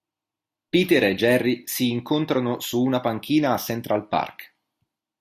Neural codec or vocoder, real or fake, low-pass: none; real; 14.4 kHz